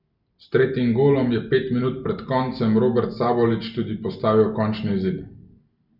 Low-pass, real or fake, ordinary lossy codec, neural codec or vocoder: 5.4 kHz; real; none; none